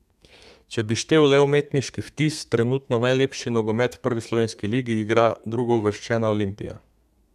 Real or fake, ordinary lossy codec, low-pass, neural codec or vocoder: fake; none; 14.4 kHz; codec, 32 kHz, 1.9 kbps, SNAC